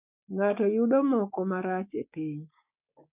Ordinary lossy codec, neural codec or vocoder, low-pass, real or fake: none; codec, 16 kHz, 6 kbps, DAC; 3.6 kHz; fake